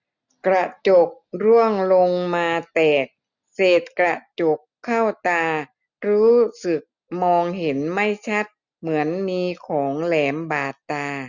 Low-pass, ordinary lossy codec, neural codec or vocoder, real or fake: 7.2 kHz; none; none; real